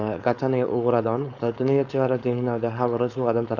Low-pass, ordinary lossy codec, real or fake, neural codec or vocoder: 7.2 kHz; none; fake; codec, 16 kHz, 4.8 kbps, FACodec